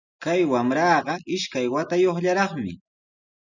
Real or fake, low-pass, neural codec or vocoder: real; 7.2 kHz; none